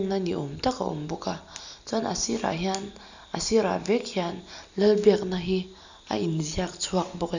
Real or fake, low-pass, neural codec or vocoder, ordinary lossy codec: real; 7.2 kHz; none; none